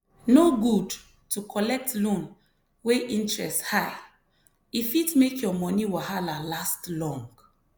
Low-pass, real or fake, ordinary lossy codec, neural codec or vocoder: none; real; none; none